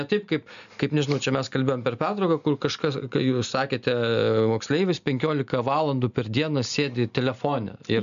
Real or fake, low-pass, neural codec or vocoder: real; 7.2 kHz; none